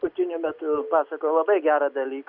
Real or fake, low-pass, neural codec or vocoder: real; 5.4 kHz; none